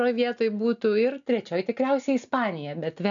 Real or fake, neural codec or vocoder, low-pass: real; none; 7.2 kHz